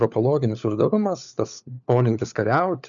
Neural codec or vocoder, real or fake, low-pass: codec, 16 kHz, 4 kbps, FunCodec, trained on LibriTTS, 50 frames a second; fake; 7.2 kHz